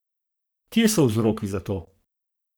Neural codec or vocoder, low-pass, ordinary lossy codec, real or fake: codec, 44.1 kHz, 3.4 kbps, Pupu-Codec; none; none; fake